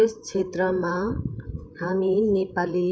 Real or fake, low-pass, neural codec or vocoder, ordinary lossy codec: fake; none; codec, 16 kHz, 8 kbps, FreqCodec, larger model; none